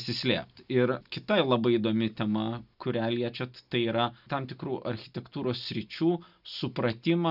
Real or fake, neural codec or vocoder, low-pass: real; none; 5.4 kHz